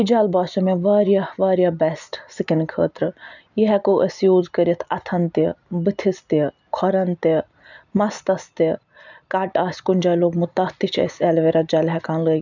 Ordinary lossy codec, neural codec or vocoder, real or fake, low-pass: none; none; real; 7.2 kHz